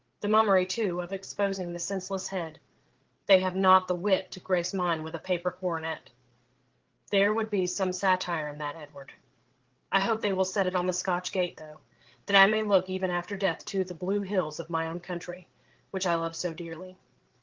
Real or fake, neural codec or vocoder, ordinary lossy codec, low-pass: fake; codec, 16 kHz, 8 kbps, FreqCodec, larger model; Opus, 16 kbps; 7.2 kHz